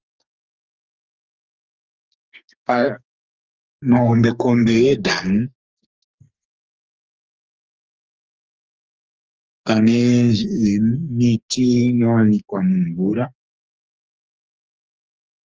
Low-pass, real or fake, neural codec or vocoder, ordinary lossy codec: 7.2 kHz; fake; codec, 44.1 kHz, 2.6 kbps, SNAC; Opus, 24 kbps